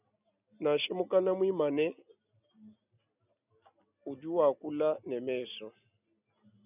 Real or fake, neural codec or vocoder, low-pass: real; none; 3.6 kHz